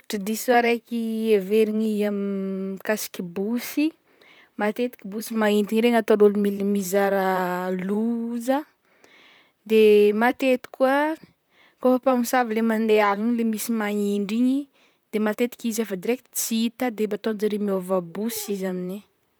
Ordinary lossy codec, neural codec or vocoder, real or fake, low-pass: none; vocoder, 44.1 kHz, 128 mel bands, Pupu-Vocoder; fake; none